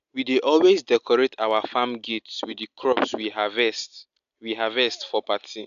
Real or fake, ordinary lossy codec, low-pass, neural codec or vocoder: real; AAC, 96 kbps; 7.2 kHz; none